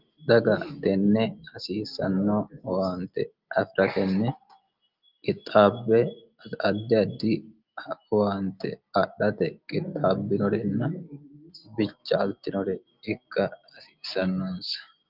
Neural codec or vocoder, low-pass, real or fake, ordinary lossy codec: none; 5.4 kHz; real; Opus, 24 kbps